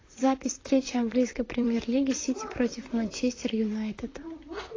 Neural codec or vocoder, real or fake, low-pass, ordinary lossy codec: vocoder, 44.1 kHz, 128 mel bands, Pupu-Vocoder; fake; 7.2 kHz; AAC, 32 kbps